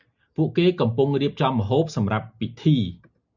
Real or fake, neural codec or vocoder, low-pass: real; none; 7.2 kHz